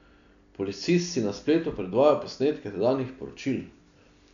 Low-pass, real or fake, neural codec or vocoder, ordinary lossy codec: 7.2 kHz; real; none; none